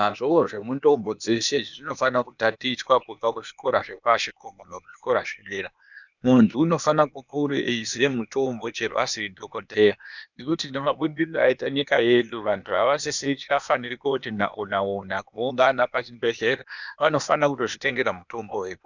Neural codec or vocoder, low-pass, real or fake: codec, 16 kHz, 0.8 kbps, ZipCodec; 7.2 kHz; fake